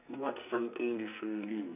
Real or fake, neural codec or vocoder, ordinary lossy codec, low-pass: fake; codec, 44.1 kHz, 2.6 kbps, SNAC; none; 3.6 kHz